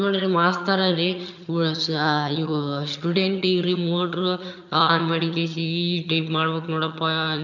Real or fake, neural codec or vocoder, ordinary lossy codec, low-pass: fake; vocoder, 22.05 kHz, 80 mel bands, HiFi-GAN; none; 7.2 kHz